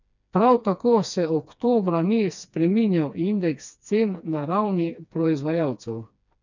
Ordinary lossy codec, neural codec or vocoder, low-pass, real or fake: none; codec, 16 kHz, 2 kbps, FreqCodec, smaller model; 7.2 kHz; fake